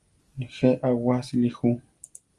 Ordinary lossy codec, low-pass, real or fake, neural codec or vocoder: Opus, 32 kbps; 10.8 kHz; real; none